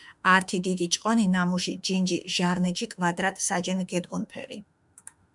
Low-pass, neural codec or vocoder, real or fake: 10.8 kHz; autoencoder, 48 kHz, 32 numbers a frame, DAC-VAE, trained on Japanese speech; fake